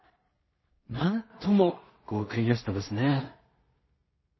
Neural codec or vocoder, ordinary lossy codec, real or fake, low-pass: codec, 16 kHz in and 24 kHz out, 0.4 kbps, LongCat-Audio-Codec, two codebook decoder; MP3, 24 kbps; fake; 7.2 kHz